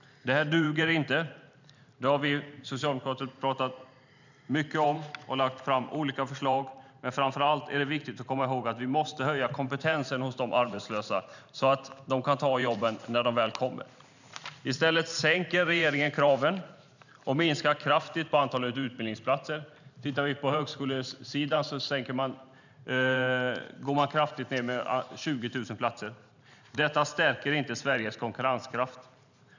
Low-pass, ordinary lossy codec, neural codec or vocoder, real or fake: 7.2 kHz; none; vocoder, 44.1 kHz, 128 mel bands every 512 samples, BigVGAN v2; fake